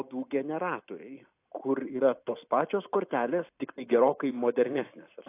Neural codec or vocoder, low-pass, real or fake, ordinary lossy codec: vocoder, 44.1 kHz, 128 mel bands every 256 samples, BigVGAN v2; 3.6 kHz; fake; AAC, 24 kbps